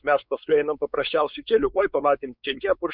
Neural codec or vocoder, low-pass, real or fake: codec, 16 kHz, 4 kbps, X-Codec, WavLM features, trained on Multilingual LibriSpeech; 5.4 kHz; fake